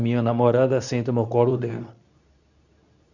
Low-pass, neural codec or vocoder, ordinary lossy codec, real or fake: 7.2 kHz; codec, 24 kHz, 0.9 kbps, WavTokenizer, medium speech release version 2; none; fake